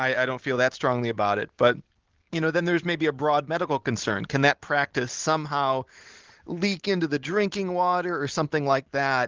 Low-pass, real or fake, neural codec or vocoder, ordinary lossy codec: 7.2 kHz; real; none; Opus, 16 kbps